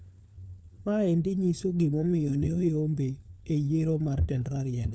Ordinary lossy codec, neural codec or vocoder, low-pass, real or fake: none; codec, 16 kHz, 4 kbps, FunCodec, trained on LibriTTS, 50 frames a second; none; fake